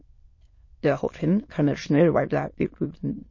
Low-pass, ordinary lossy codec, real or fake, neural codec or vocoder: 7.2 kHz; MP3, 32 kbps; fake; autoencoder, 22.05 kHz, a latent of 192 numbers a frame, VITS, trained on many speakers